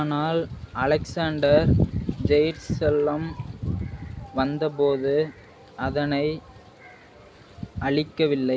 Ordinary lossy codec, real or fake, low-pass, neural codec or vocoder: none; real; none; none